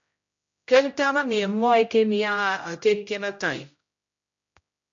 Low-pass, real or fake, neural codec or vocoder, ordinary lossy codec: 7.2 kHz; fake; codec, 16 kHz, 0.5 kbps, X-Codec, HuBERT features, trained on general audio; MP3, 48 kbps